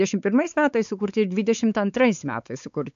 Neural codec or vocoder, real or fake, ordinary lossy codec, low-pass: codec, 16 kHz, 4 kbps, X-Codec, WavLM features, trained on Multilingual LibriSpeech; fake; AAC, 96 kbps; 7.2 kHz